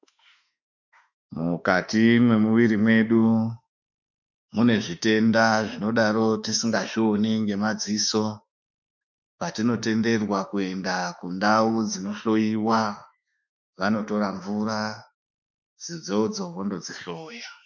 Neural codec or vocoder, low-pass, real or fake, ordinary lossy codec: autoencoder, 48 kHz, 32 numbers a frame, DAC-VAE, trained on Japanese speech; 7.2 kHz; fake; MP3, 64 kbps